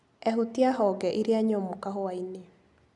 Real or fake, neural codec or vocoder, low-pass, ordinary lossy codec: real; none; 10.8 kHz; none